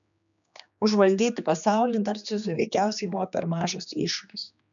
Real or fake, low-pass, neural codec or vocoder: fake; 7.2 kHz; codec, 16 kHz, 2 kbps, X-Codec, HuBERT features, trained on general audio